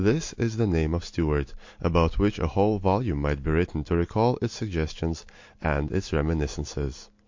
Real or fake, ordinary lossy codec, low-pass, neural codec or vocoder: real; MP3, 48 kbps; 7.2 kHz; none